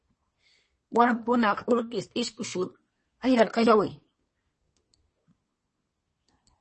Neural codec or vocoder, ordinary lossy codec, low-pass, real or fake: codec, 24 kHz, 1 kbps, SNAC; MP3, 32 kbps; 10.8 kHz; fake